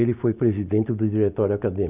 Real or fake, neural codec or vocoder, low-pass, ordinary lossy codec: real; none; 3.6 kHz; none